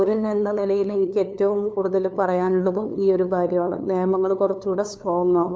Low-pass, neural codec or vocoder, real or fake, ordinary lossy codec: none; codec, 16 kHz, 2 kbps, FunCodec, trained on LibriTTS, 25 frames a second; fake; none